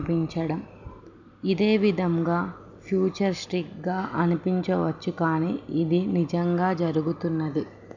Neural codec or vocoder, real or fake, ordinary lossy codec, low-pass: none; real; none; 7.2 kHz